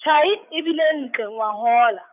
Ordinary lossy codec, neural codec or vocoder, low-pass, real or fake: none; codec, 16 kHz, 8 kbps, FreqCodec, larger model; 3.6 kHz; fake